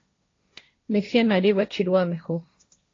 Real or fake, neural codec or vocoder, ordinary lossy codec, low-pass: fake; codec, 16 kHz, 1.1 kbps, Voila-Tokenizer; AAC, 32 kbps; 7.2 kHz